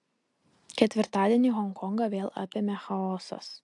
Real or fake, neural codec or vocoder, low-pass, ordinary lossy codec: real; none; 10.8 kHz; AAC, 64 kbps